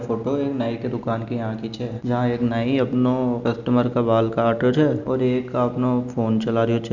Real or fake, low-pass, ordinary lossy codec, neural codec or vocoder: real; 7.2 kHz; none; none